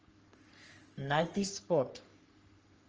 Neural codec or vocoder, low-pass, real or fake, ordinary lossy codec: codec, 44.1 kHz, 3.4 kbps, Pupu-Codec; 7.2 kHz; fake; Opus, 24 kbps